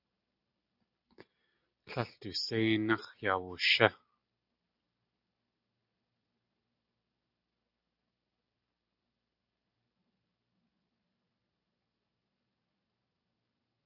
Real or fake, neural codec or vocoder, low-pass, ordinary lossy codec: real; none; 5.4 kHz; AAC, 48 kbps